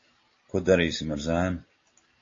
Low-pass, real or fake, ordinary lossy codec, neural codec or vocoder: 7.2 kHz; real; AAC, 32 kbps; none